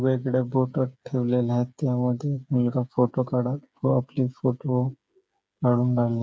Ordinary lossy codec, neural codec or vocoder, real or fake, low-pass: none; codec, 16 kHz, 8 kbps, FreqCodec, smaller model; fake; none